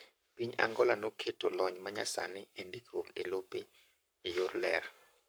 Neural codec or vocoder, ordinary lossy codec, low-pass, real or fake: codec, 44.1 kHz, 7.8 kbps, Pupu-Codec; none; none; fake